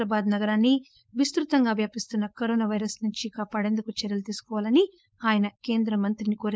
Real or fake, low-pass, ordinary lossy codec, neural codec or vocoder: fake; none; none; codec, 16 kHz, 4.8 kbps, FACodec